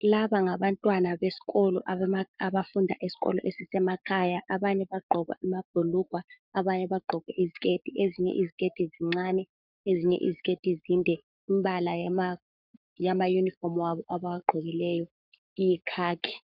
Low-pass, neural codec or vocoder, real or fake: 5.4 kHz; codec, 44.1 kHz, 7.8 kbps, Pupu-Codec; fake